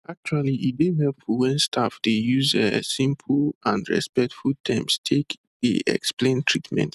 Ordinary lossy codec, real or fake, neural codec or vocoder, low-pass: none; real; none; 14.4 kHz